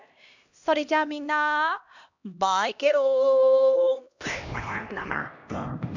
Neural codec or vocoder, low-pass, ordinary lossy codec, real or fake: codec, 16 kHz, 1 kbps, X-Codec, HuBERT features, trained on LibriSpeech; 7.2 kHz; none; fake